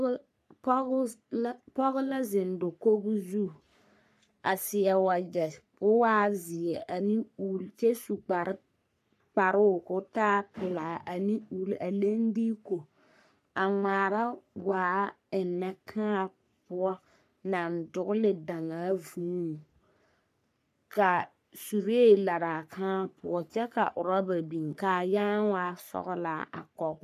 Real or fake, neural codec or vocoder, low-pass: fake; codec, 44.1 kHz, 3.4 kbps, Pupu-Codec; 14.4 kHz